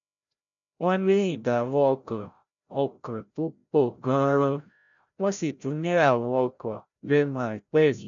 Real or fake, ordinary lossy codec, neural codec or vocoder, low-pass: fake; none; codec, 16 kHz, 0.5 kbps, FreqCodec, larger model; 7.2 kHz